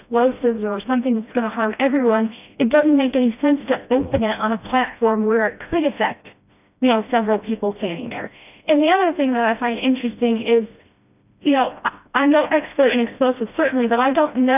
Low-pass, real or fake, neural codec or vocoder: 3.6 kHz; fake; codec, 16 kHz, 1 kbps, FreqCodec, smaller model